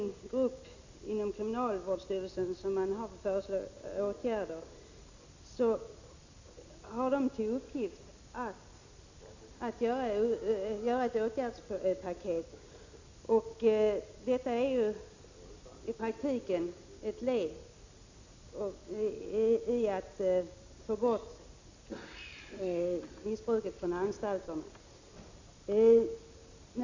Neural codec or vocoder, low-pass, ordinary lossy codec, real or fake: none; 7.2 kHz; Opus, 64 kbps; real